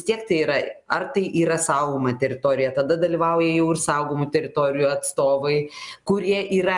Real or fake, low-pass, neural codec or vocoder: real; 10.8 kHz; none